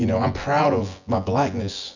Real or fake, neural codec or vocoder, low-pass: fake; vocoder, 24 kHz, 100 mel bands, Vocos; 7.2 kHz